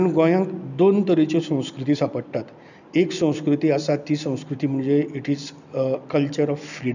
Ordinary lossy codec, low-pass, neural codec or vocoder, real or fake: none; 7.2 kHz; none; real